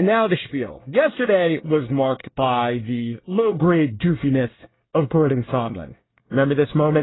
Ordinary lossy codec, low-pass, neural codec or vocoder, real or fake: AAC, 16 kbps; 7.2 kHz; codec, 24 kHz, 1 kbps, SNAC; fake